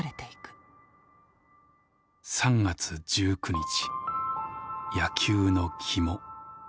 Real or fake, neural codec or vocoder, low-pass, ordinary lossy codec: real; none; none; none